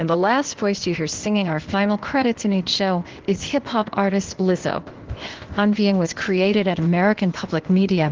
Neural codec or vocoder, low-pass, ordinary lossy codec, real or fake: codec, 16 kHz, 0.8 kbps, ZipCodec; 7.2 kHz; Opus, 16 kbps; fake